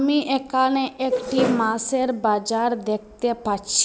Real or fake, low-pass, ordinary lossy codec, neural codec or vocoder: real; none; none; none